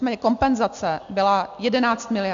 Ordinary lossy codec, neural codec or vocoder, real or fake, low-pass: AAC, 64 kbps; none; real; 7.2 kHz